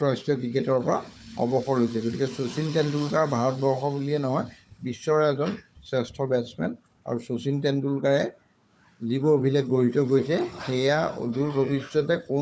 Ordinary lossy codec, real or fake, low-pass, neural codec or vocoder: none; fake; none; codec, 16 kHz, 4 kbps, FunCodec, trained on Chinese and English, 50 frames a second